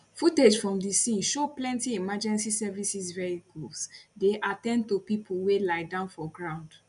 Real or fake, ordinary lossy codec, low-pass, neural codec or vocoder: real; none; 10.8 kHz; none